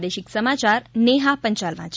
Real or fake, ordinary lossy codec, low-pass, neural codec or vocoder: real; none; none; none